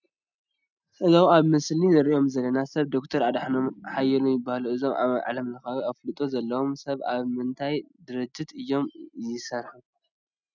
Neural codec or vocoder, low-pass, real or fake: none; 7.2 kHz; real